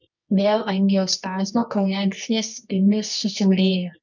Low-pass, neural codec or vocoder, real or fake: 7.2 kHz; codec, 24 kHz, 0.9 kbps, WavTokenizer, medium music audio release; fake